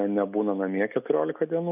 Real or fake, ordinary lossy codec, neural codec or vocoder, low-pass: real; AAC, 32 kbps; none; 3.6 kHz